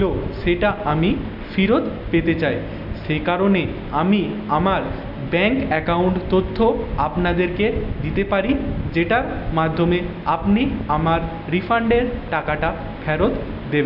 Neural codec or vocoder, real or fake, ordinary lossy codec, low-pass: none; real; none; 5.4 kHz